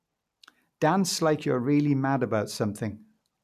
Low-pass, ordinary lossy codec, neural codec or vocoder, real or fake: 14.4 kHz; none; none; real